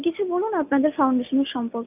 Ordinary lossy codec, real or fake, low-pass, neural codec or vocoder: none; real; 3.6 kHz; none